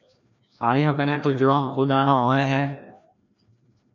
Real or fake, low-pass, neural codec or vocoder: fake; 7.2 kHz; codec, 16 kHz, 1 kbps, FreqCodec, larger model